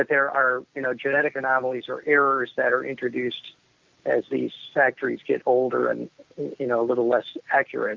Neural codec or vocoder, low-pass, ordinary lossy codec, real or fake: none; 7.2 kHz; Opus, 24 kbps; real